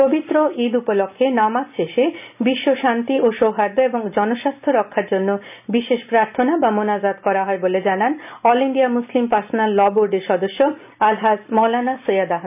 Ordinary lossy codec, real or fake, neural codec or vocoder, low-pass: none; real; none; 3.6 kHz